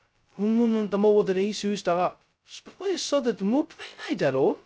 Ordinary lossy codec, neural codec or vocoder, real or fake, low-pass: none; codec, 16 kHz, 0.2 kbps, FocalCodec; fake; none